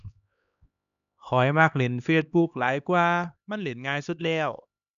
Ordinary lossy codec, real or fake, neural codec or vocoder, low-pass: none; fake; codec, 16 kHz, 2 kbps, X-Codec, HuBERT features, trained on LibriSpeech; 7.2 kHz